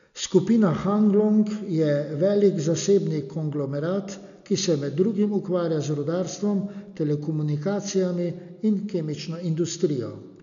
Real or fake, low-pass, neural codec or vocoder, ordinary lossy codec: real; 7.2 kHz; none; none